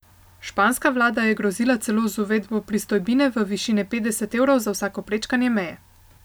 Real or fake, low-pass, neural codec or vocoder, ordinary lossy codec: real; none; none; none